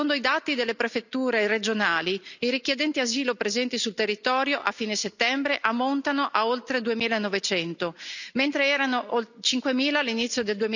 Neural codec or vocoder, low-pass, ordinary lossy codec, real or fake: none; 7.2 kHz; none; real